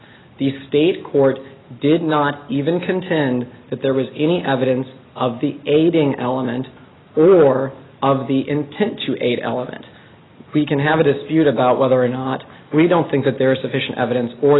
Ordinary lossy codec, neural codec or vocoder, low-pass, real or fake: AAC, 16 kbps; none; 7.2 kHz; real